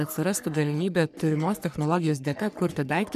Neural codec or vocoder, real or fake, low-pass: codec, 44.1 kHz, 3.4 kbps, Pupu-Codec; fake; 14.4 kHz